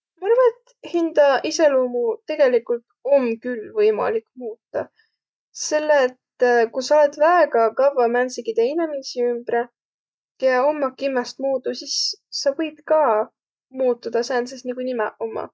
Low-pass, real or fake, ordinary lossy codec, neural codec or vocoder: none; real; none; none